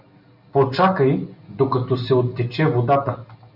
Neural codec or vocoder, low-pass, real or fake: none; 5.4 kHz; real